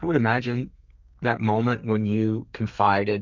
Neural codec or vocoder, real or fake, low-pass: codec, 32 kHz, 1.9 kbps, SNAC; fake; 7.2 kHz